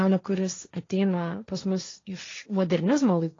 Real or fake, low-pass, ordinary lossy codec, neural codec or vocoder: fake; 7.2 kHz; AAC, 32 kbps; codec, 16 kHz, 1.1 kbps, Voila-Tokenizer